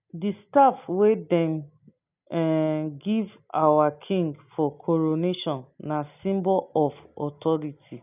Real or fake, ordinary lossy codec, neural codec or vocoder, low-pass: real; none; none; 3.6 kHz